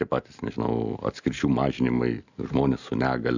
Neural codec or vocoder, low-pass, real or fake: none; 7.2 kHz; real